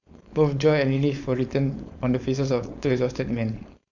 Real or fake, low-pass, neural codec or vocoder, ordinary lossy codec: fake; 7.2 kHz; codec, 16 kHz, 4.8 kbps, FACodec; none